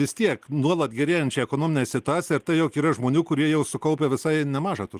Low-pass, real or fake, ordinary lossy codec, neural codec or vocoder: 14.4 kHz; real; Opus, 24 kbps; none